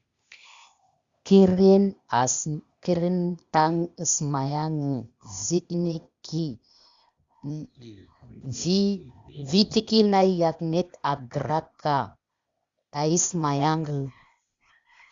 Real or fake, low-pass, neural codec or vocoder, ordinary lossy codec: fake; 7.2 kHz; codec, 16 kHz, 0.8 kbps, ZipCodec; Opus, 64 kbps